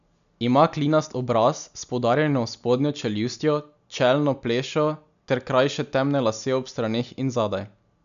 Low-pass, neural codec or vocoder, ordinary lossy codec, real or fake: 7.2 kHz; none; none; real